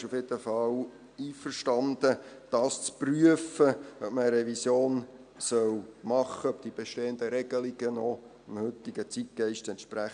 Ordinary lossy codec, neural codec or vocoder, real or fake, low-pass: none; none; real; 9.9 kHz